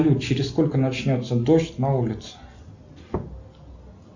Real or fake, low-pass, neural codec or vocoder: real; 7.2 kHz; none